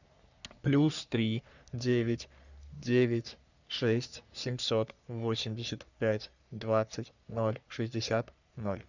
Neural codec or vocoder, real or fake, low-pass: codec, 44.1 kHz, 3.4 kbps, Pupu-Codec; fake; 7.2 kHz